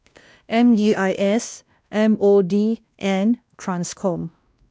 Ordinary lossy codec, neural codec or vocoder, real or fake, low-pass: none; codec, 16 kHz, 1 kbps, X-Codec, WavLM features, trained on Multilingual LibriSpeech; fake; none